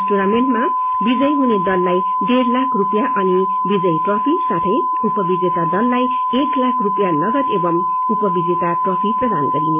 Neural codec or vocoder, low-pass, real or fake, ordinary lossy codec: none; 3.6 kHz; real; MP3, 24 kbps